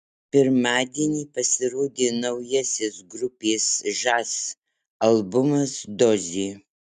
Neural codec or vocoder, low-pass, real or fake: none; 14.4 kHz; real